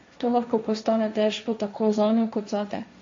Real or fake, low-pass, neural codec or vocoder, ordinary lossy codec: fake; 7.2 kHz; codec, 16 kHz, 1.1 kbps, Voila-Tokenizer; MP3, 48 kbps